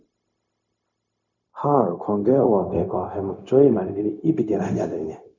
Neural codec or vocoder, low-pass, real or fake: codec, 16 kHz, 0.4 kbps, LongCat-Audio-Codec; 7.2 kHz; fake